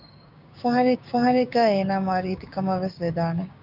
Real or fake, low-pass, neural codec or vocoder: fake; 5.4 kHz; codec, 16 kHz, 6 kbps, DAC